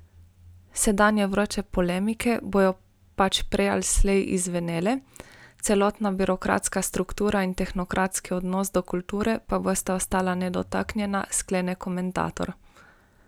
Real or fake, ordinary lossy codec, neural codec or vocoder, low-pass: real; none; none; none